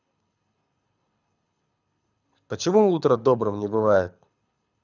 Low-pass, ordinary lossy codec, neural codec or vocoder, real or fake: 7.2 kHz; none; codec, 24 kHz, 6 kbps, HILCodec; fake